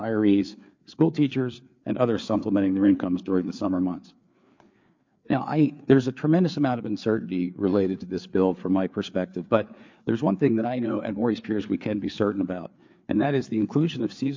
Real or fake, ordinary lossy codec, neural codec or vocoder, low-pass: fake; MP3, 48 kbps; codec, 16 kHz, 4 kbps, FreqCodec, larger model; 7.2 kHz